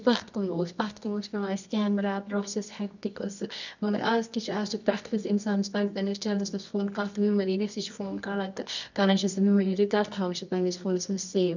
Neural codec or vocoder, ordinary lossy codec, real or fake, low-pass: codec, 24 kHz, 0.9 kbps, WavTokenizer, medium music audio release; none; fake; 7.2 kHz